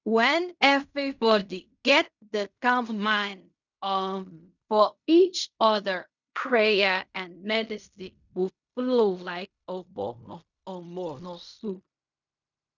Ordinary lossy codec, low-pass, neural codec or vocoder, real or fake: none; 7.2 kHz; codec, 16 kHz in and 24 kHz out, 0.4 kbps, LongCat-Audio-Codec, fine tuned four codebook decoder; fake